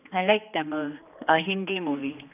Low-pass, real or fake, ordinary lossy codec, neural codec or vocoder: 3.6 kHz; fake; none; codec, 16 kHz, 2 kbps, X-Codec, HuBERT features, trained on general audio